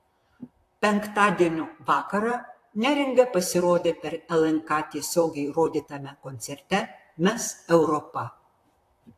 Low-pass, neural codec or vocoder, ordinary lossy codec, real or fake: 14.4 kHz; vocoder, 44.1 kHz, 128 mel bands, Pupu-Vocoder; AAC, 64 kbps; fake